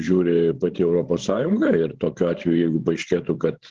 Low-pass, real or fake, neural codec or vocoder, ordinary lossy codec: 7.2 kHz; fake; codec, 16 kHz, 16 kbps, FreqCodec, larger model; Opus, 16 kbps